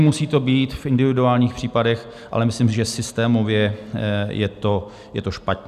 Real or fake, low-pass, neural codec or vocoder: real; 14.4 kHz; none